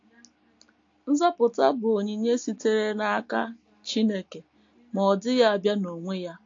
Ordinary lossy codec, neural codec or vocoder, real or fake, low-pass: AAC, 48 kbps; none; real; 7.2 kHz